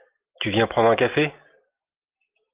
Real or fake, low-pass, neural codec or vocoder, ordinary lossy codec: real; 3.6 kHz; none; Opus, 32 kbps